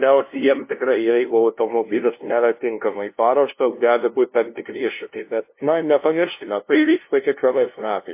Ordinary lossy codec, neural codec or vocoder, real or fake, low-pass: MP3, 24 kbps; codec, 16 kHz, 0.5 kbps, FunCodec, trained on LibriTTS, 25 frames a second; fake; 3.6 kHz